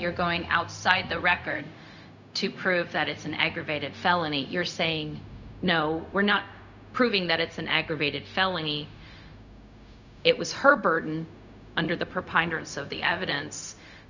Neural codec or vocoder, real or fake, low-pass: codec, 16 kHz, 0.4 kbps, LongCat-Audio-Codec; fake; 7.2 kHz